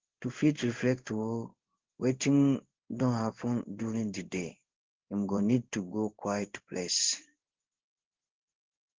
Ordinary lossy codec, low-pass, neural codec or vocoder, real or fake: Opus, 16 kbps; 7.2 kHz; codec, 16 kHz in and 24 kHz out, 1 kbps, XY-Tokenizer; fake